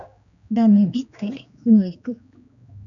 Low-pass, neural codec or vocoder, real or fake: 7.2 kHz; codec, 16 kHz, 2 kbps, X-Codec, HuBERT features, trained on general audio; fake